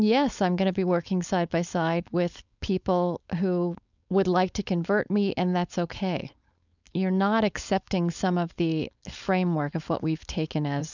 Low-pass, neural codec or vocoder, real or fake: 7.2 kHz; codec, 16 kHz, 4.8 kbps, FACodec; fake